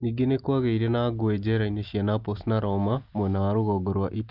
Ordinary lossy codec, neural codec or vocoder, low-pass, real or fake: Opus, 24 kbps; none; 5.4 kHz; real